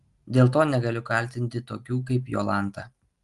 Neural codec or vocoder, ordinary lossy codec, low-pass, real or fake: none; Opus, 24 kbps; 10.8 kHz; real